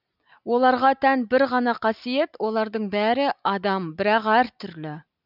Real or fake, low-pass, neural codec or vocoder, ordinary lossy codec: real; 5.4 kHz; none; none